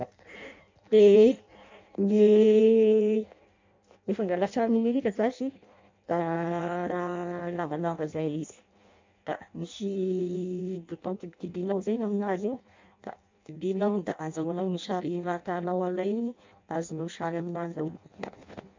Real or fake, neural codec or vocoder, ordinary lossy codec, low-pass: fake; codec, 16 kHz in and 24 kHz out, 0.6 kbps, FireRedTTS-2 codec; MP3, 64 kbps; 7.2 kHz